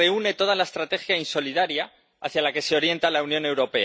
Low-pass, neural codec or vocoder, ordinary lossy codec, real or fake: none; none; none; real